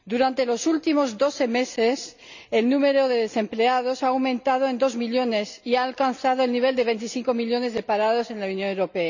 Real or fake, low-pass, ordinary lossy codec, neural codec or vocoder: real; 7.2 kHz; none; none